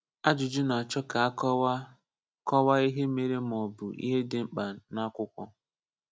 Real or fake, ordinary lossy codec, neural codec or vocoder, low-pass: real; none; none; none